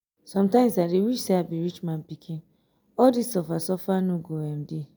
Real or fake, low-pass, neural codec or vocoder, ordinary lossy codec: real; none; none; none